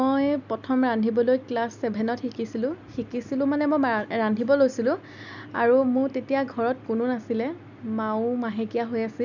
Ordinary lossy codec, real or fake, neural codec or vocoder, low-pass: none; real; none; 7.2 kHz